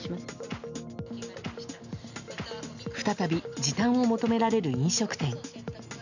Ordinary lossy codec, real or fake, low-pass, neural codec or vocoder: none; real; 7.2 kHz; none